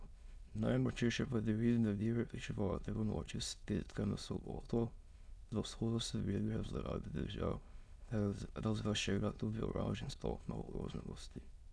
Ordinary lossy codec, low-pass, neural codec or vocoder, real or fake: none; none; autoencoder, 22.05 kHz, a latent of 192 numbers a frame, VITS, trained on many speakers; fake